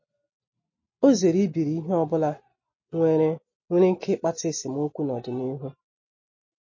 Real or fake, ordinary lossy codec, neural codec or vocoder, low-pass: real; MP3, 32 kbps; none; 7.2 kHz